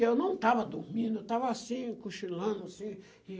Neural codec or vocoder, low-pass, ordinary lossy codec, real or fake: none; none; none; real